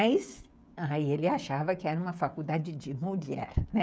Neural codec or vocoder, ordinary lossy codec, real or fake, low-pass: codec, 16 kHz, 16 kbps, FreqCodec, smaller model; none; fake; none